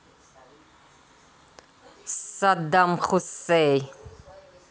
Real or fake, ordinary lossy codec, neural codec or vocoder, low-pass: real; none; none; none